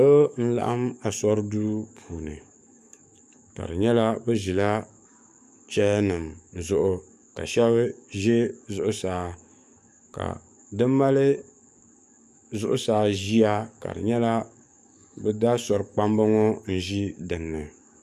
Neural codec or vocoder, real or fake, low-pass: codec, 44.1 kHz, 7.8 kbps, DAC; fake; 14.4 kHz